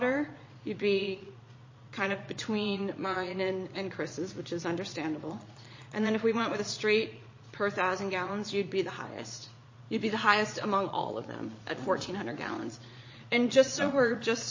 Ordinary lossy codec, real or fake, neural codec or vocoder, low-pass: MP3, 32 kbps; fake; vocoder, 22.05 kHz, 80 mel bands, Vocos; 7.2 kHz